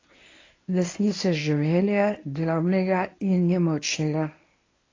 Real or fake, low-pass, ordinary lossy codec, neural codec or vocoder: fake; 7.2 kHz; AAC, 32 kbps; codec, 24 kHz, 0.9 kbps, WavTokenizer, medium speech release version 1